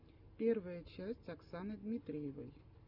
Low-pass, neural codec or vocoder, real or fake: 5.4 kHz; none; real